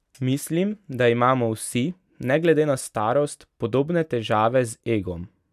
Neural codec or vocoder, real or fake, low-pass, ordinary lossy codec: none; real; 14.4 kHz; none